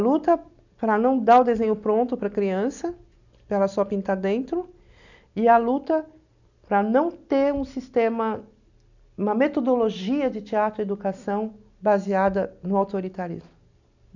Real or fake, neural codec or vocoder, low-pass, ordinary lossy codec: real; none; 7.2 kHz; none